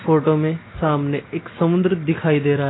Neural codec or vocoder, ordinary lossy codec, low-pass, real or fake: none; AAC, 16 kbps; 7.2 kHz; real